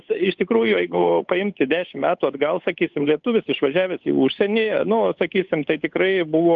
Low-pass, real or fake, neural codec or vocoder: 7.2 kHz; fake; codec, 16 kHz, 8 kbps, FunCodec, trained on Chinese and English, 25 frames a second